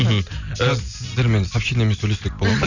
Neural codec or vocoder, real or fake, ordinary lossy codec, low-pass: none; real; none; 7.2 kHz